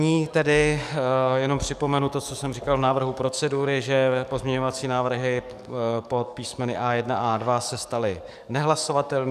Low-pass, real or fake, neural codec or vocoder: 14.4 kHz; fake; codec, 44.1 kHz, 7.8 kbps, DAC